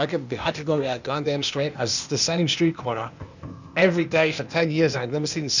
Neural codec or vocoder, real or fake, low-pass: codec, 16 kHz, 0.8 kbps, ZipCodec; fake; 7.2 kHz